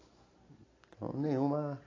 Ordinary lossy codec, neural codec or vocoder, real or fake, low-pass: AAC, 32 kbps; none; real; 7.2 kHz